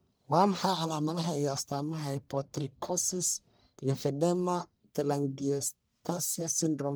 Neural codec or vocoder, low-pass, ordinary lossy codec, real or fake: codec, 44.1 kHz, 1.7 kbps, Pupu-Codec; none; none; fake